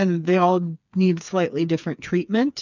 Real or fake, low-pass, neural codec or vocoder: fake; 7.2 kHz; codec, 16 kHz, 4 kbps, FreqCodec, smaller model